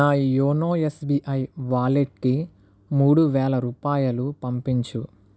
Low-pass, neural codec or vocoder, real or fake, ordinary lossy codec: none; none; real; none